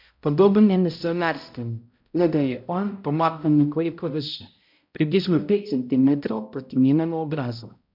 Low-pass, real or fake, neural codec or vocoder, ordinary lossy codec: 5.4 kHz; fake; codec, 16 kHz, 0.5 kbps, X-Codec, HuBERT features, trained on balanced general audio; MP3, 48 kbps